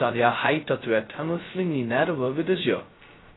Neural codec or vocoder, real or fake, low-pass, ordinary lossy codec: codec, 16 kHz, 0.2 kbps, FocalCodec; fake; 7.2 kHz; AAC, 16 kbps